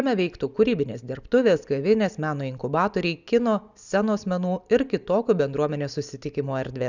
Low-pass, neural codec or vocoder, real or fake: 7.2 kHz; none; real